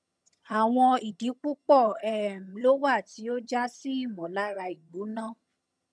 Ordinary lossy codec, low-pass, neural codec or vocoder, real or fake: none; none; vocoder, 22.05 kHz, 80 mel bands, HiFi-GAN; fake